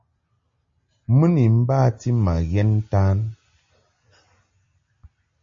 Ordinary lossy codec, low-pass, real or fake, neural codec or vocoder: MP3, 32 kbps; 7.2 kHz; real; none